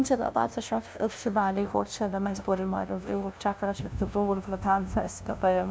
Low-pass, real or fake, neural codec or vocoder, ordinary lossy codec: none; fake; codec, 16 kHz, 0.5 kbps, FunCodec, trained on LibriTTS, 25 frames a second; none